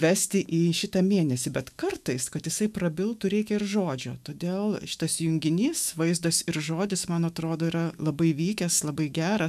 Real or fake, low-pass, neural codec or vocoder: fake; 14.4 kHz; autoencoder, 48 kHz, 128 numbers a frame, DAC-VAE, trained on Japanese speech